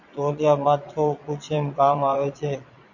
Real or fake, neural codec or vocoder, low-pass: fake; vocoder, 22.05 kHz, 80 mel bands, Vocos; 7.2 kHz